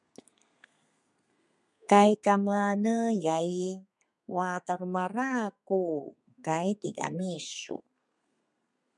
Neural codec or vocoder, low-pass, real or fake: codec, 32 kHz, 1.9 kbps, SNAC; 10.8 kHz; fake